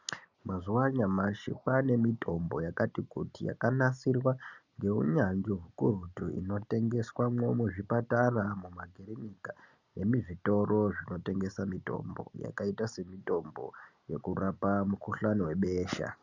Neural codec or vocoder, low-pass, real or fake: none; 7.2 kHz; real